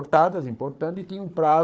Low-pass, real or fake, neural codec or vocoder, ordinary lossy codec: none; fake; codec, 16 kHz, 4 kbps, FunCodec, trained on Chinese and English, 50 frames a second; none